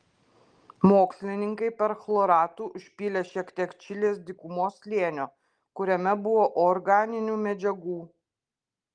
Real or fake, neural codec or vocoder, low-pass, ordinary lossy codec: real; none; 9.9 kHz; Opus, 24 kbps